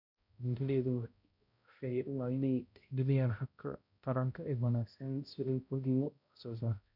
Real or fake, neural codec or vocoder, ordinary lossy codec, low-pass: fake; codec, 16 kHz, 0.5 kbps, X-Codec, HuBERT features, trained on balanced general audio; none; 5.4 kHz